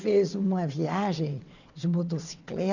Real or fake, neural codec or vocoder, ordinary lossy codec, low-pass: fake; vocoder, 22.05 kHz, 80 mel bands, WaveNeXt; none; 7.2 kHz